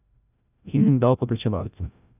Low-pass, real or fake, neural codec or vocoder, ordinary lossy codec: 3.6 kHz; fake; codec, 16 kHz, 0.5 kbps, FreqCodec, larger model; none